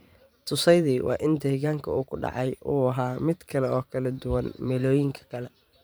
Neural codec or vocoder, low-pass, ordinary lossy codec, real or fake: vocoder, 44.1 kHz, 128 mel bands every 512 samples, BigVGAN v2; none; none; fake